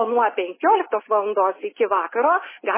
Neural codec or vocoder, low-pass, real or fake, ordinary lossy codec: none; 3.6 kHz; real; MP3, 16 kbps